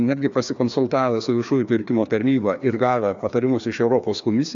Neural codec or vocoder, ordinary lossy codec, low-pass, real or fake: codec, 16 kHz, 2 kbps, FreqCodec, larger model; AAC, 64 kbps; 7.2 kHz; fake